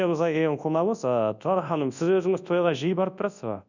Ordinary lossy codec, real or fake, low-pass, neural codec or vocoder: none; fake; 7.2 kHz; codec, 24 kHz, 0.9 kbps, WavTokenizer, large speech release